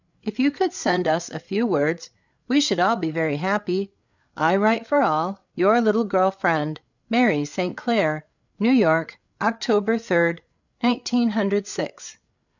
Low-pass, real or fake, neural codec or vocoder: 7.2 kHz; fake; codec, 16 kHz, 8 kbps, FreqCodec, larger model